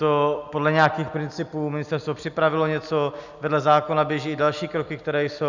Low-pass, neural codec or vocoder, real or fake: 7.2 kHz; none; real